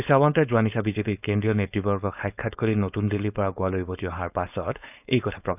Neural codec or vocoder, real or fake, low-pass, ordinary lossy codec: codec, 16 kHz, 4.8 kbps, FACodec; fake; 3.6 kHz; none